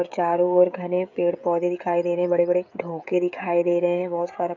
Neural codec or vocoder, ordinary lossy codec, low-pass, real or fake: codec, 16 kHz, 16 kbps, FreqCodec, smaller model; MP3, 64 kbps; 7.2 kHz; fake